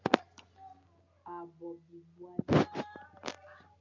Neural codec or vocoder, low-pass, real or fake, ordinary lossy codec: none; 7.2 kHz; real; MP3, 64 kbps